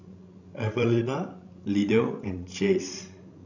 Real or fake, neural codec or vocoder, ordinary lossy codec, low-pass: fake; codec, 16 kHz, 16 kbps, FreqCodec, larger model; none; 7.2 kHz